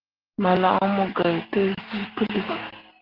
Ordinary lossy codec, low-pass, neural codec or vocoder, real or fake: Opus, 16 kbps; 5.4 kHz; none; real